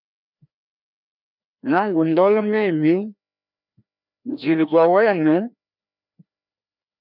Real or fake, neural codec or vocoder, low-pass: fake; codec, 16 kHz, 1 kbps, FreqCodec, larger model; 5.4 kHz